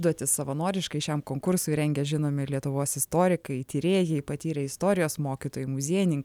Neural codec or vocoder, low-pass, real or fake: none; 19.8 kHz; real